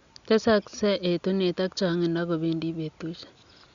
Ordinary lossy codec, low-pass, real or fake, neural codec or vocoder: Opus, 64 kbps; 7.2 kHz; real; none